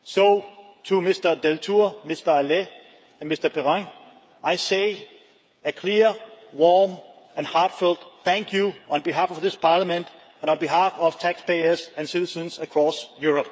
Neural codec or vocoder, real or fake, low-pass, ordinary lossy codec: codec, 16 kHz, 8 kbps, FreqCodec, smaller model; fake; none; none